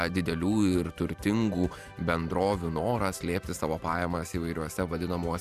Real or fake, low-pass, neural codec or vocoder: fake; 14.4 kHz; vocoder, 44.1 kHz, 128 mel bands every 512 samples, BigVGAN v2